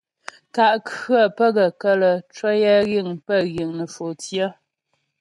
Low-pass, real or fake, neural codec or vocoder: 10.8 kHz; real; none